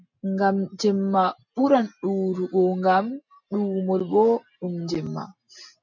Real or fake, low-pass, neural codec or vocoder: real; 7.2 kHz; none